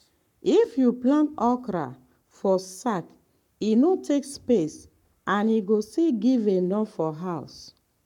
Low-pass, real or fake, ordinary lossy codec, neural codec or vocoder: 19.8 kHz; fake; none; codec, 44.1 kHz, 7.8 kbps, Pupu-Codec